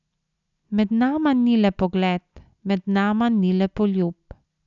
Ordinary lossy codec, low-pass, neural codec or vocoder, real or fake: none; 7.2 kHz; none; real